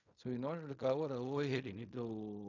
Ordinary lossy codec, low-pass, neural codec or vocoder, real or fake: none; 7.2 kHz; codec, 16 kHz in and 24 kHz out, 0.4 kbps, LongCat-Audio-Codec, fine tuned four codebook decoder; fake